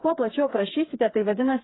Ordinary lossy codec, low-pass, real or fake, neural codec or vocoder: AAC, 16 kbps; 7.2 kHz; fake; codec, 16 kHz, 4 kbps, FreqCodec, smaller model